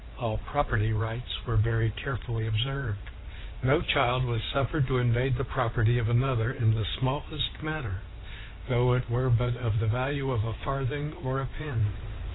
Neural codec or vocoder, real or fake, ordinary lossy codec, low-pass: codec, 16 kHz in and 24 kHz out, 2.2 kbps, FireRedTTS-2 codec; fake; AAC, 16 kbps; 7.2 kHz